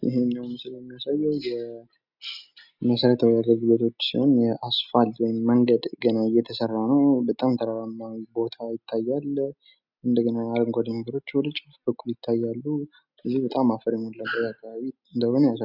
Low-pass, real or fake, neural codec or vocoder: 5.4 kHz; real; none